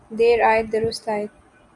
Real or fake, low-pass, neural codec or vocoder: real; 10.8 kHz; none